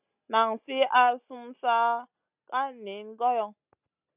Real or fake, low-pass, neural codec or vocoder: real; 3.6 kHz; none